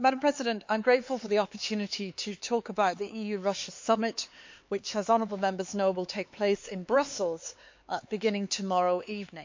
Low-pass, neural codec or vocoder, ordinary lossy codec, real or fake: 7.2 kHz; codec, 16 kHz, 4 kbps, X-Codec, HuBERT features, trained on LibriSpeech; MP3, 48 kbps; fake